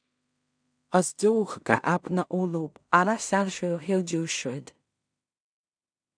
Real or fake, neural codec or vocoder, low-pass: fake; codec, 16 kHz in and 24 kHz out, 0.4 kbps, LongCat-Audio-Codec, two codebook decoder; 9.9 kHz